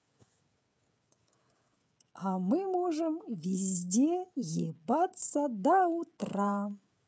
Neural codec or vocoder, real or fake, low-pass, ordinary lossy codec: codec, 16 kHz, 16 kbps, FreqCodec, smaller model; fake; none; none